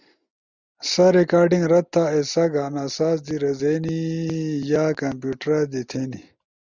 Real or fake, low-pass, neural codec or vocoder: real; 7.2 kHz; none